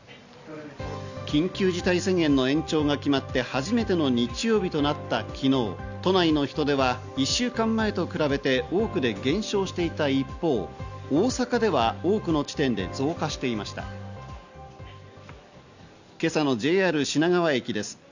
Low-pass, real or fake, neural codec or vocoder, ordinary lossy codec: 7.2 kHz; real; none; none